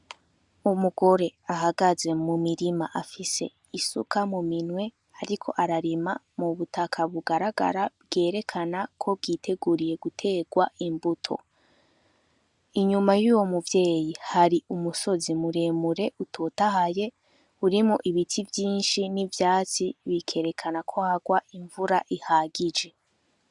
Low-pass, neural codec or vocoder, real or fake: 10.8 kHz; none; real